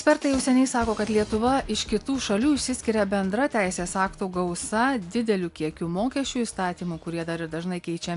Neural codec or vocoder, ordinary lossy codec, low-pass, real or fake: none; AAC, 96 kbps; 10.8 kHz; real